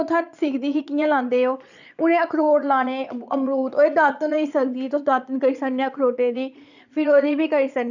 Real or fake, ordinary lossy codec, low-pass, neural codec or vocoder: fake; none; 7.2 kHz; vocoder, 22.05 kHz, 80 mel bands, Vocos